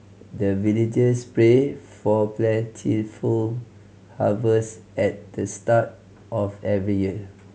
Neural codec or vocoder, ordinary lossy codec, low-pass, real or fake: none; none; none; real